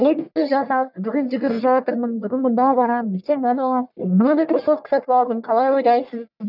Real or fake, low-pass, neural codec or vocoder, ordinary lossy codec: fake; 5.4 kHz; codec, 44.1 kHz, 1.7 kbps, Pupu-Codec; none